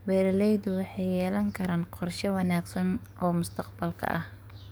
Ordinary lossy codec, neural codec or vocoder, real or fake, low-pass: none; codec, 44.1 kHz, 7.8 kbps, DAC; fake; none